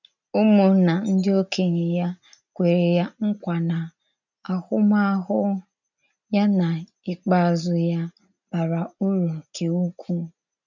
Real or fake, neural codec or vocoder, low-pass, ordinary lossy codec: real; none; 7.2 kHz; none